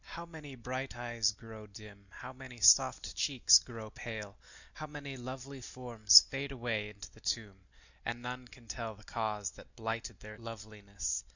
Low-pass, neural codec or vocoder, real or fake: 7.2 kHz; none; real